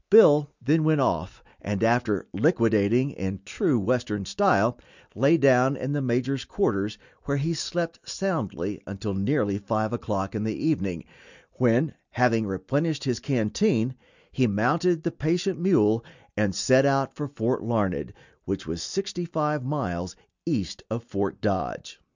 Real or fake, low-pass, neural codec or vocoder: real; 7.2 kHz; none